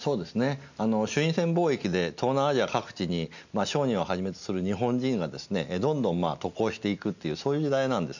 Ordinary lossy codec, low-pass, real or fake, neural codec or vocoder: none; 7.2 kHz; real; none